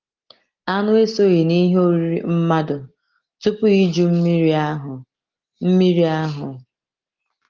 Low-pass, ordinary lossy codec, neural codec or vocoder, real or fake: 7.2 kHz; Opus, 16 kbps; none; real